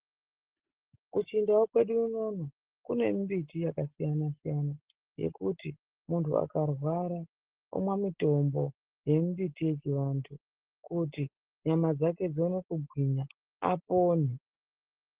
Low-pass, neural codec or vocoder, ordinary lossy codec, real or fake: 3.6 kHz; none; Opus, 16 kbps; real